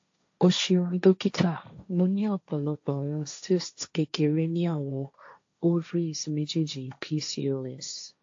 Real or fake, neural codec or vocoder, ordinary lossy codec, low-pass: fake; codec, 16 kHz, 1.1 kbps, Voila-Tokenizer; AAC, 48 kbps; 7.2 kHz